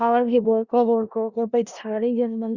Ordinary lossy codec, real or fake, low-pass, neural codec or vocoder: Opus, 64 kbps; fake; 7.2 kHz; codec, 16 kHz in and 24 kHz out, 0.4 kbps, LongCat-Audio-Codec, four codebook decoder